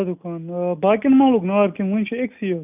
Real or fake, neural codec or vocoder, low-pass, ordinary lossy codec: real; none; 3.6 kHz; none